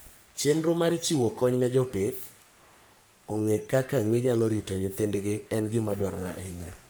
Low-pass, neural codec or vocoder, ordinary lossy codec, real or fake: none; codec, 44.1 kHz, 3.4 kbps, Pupu-Codec; none; fake